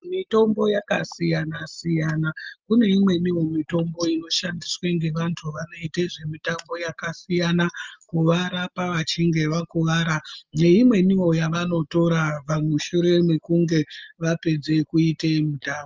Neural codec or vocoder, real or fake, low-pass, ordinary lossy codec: none; real; 7.2 kHz; Opus, 32 kbps